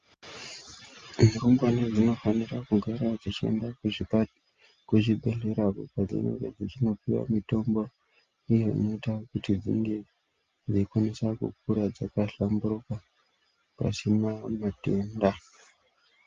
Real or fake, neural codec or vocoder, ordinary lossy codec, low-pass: real; none; Opus, 32 kbps; 7.2 kHz